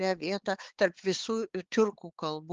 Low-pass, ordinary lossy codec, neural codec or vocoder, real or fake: 7.2 kHz; Opus, 16 kbps; codec, 16 kHz, 4 kbps, X-Codec, HuBERT features, trained on balanced general audio; fake